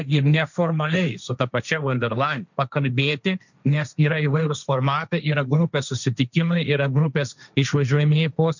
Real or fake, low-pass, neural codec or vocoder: fake; 7.2 kHz; codec, 16 kHz, 1.1 kbps, Voila-Tokenizer